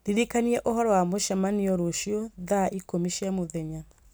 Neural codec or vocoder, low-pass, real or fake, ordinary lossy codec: none; none; real; none